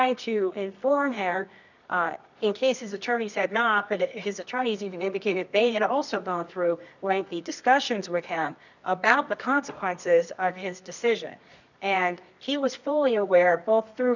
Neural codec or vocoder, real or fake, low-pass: codec, 24 kHz, 0.9 kbps, WavTokenizer, medium music audio release; fake; 7.2 kHz